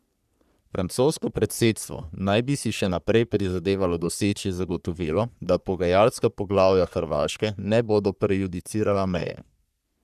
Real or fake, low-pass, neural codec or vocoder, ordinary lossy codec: fake; 14.4 kHz; codec, 44.1 kHz, 3.4 kbps, Pupu-Codec; none